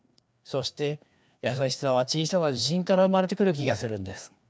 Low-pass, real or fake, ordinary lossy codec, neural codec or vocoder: none; fake; none; codec, 16 kHz, 2 kbps, FreqCodec, larger model